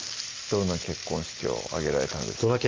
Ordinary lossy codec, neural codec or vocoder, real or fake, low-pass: Opus, 32 kbps; none; real; 7.2 kHz